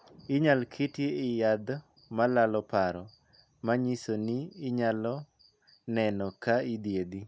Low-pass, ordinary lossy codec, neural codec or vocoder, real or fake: none; none; none; real